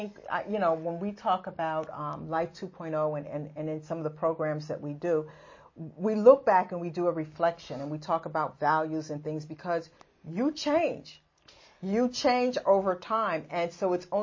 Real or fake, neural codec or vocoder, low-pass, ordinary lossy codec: real; none; 7.2 kHz; MP3, 32 kbps